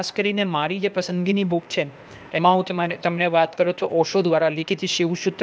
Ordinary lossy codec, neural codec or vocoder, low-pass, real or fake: none; codec, 16 kHz, 0.8 kbps, ZipCodec; none; fake